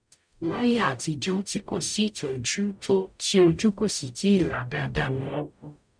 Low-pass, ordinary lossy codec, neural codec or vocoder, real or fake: 9.9 kHz; none; codec, 44.1 kHz, 0.9 kbps, DAC; fake